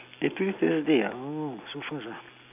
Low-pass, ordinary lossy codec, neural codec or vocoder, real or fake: 3.6 kHz; none; none; real